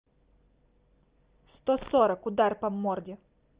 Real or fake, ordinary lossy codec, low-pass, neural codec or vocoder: real; Opus, 24 kbps; 3.6 kHz; none